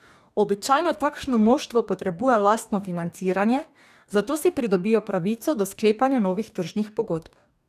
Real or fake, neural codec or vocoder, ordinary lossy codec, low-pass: fake; codec, 44.1 kHz, 2.6 kbps, DAC; none; 14.4 kHz